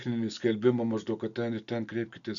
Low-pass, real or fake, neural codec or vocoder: 7.2 kHz; real; none